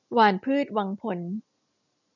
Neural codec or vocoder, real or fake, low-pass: none; real; 7.2 kHz